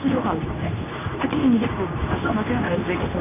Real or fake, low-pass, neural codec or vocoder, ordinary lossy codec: fake; 3.6 kHz; codec, 24 kHz, 0.9 kbps, WavTokenizer, medium speech release version 2; none